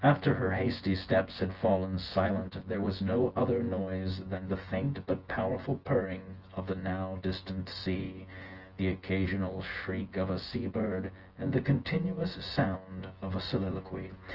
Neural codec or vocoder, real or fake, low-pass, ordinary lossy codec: vocoder, 24 kHz, 100 mel bands, Vocos; fake; 5.4 kHz; Opus, 16 kbps